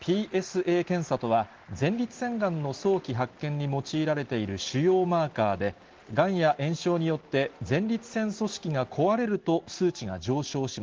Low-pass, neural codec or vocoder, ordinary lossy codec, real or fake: 7.2 kHz; none; Opus, 16 kbps; real